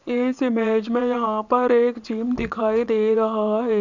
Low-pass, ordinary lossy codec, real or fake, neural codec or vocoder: 7.2 kHz; none; fake; vocoder, 22.05 kHz, 80 mel bands, WaveNeXt